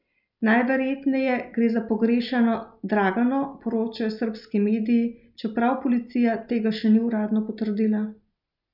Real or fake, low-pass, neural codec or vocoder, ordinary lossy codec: real; 5.4 kHz; none; none